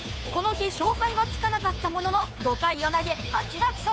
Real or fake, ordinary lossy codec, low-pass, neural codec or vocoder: fake; none; none; codec, 16 kHz, 2 kbps, FunCodec, trained on Chinese and English, 25 frames a second